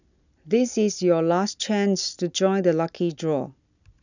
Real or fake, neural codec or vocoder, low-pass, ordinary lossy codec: real; none; 7.2 kHz; none